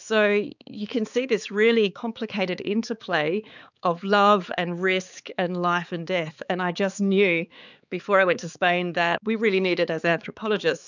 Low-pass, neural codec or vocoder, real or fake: 7.2 kHz; codec, 16 kHz, 4 kbps, X-Codec, HuBERT features, trained on balanced general audio; fake